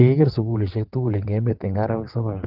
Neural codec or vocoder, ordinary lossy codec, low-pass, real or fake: vocoder, 22.05 kHz, 80 mel bands, WaveNeXt; Opus, 16 kbps; 5.4 kHz; fake